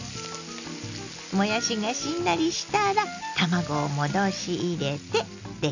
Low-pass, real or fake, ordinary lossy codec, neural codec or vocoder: 7.2 kHz; real; none; none